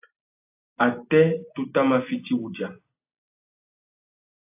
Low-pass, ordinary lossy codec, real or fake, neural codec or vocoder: 3.6 kHz; AAC, 32 kbps; real; none